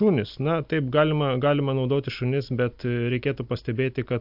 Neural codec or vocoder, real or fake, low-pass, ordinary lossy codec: none; real; 5.4 kHz; AAC, 48 kbps